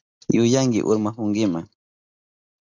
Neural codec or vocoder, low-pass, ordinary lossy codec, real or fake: none; 7.2 kHz; AAC, 48 kbps; real